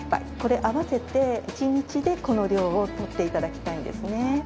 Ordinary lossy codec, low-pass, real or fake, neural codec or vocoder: none; none; real; none